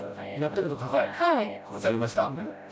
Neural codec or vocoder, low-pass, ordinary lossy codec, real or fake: codec, 16 kHz, 0.5 kbps, FreqCodec, smaller model; none; none; fake